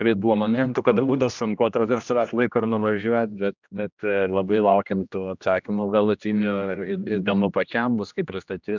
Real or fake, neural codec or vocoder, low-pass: fake; codec, 16 kHz, 1 kbps, X-Codec, HuBERT features, trained on general audio; 7.2 kHz